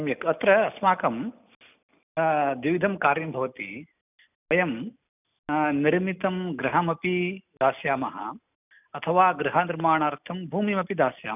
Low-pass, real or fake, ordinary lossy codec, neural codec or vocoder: 3.6 kHz; real; none; none